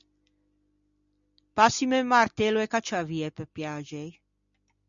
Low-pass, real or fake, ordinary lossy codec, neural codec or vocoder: 7.2 kHz; real; MP3, 96 kbps; none